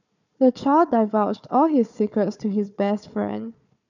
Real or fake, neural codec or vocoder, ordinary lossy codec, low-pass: fake; codec, 16 kHz, 4 kbps, FunCodec, trained on Chinese and English, 50 frames a second; none; 7.2 kHz